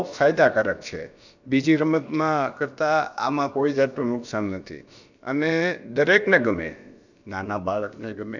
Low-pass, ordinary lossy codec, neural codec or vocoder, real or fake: 7.2 kHz; none; codec, 16 kHz, about 1 kbps, DyCAST, with the encoder's durations; fake